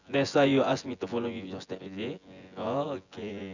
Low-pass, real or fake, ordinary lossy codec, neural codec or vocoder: 7.2 kHz; fake; none; vocoder, 24 kHz, 100 mel bands, Vocos